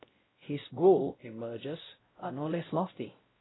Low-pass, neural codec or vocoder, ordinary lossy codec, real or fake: 7.2 kHz; codec, 16 kHz, 0.5 kbps, X-Codec, WavLM features, trained on Multilingual LibriSpeech; AAC, 16 kbps; fake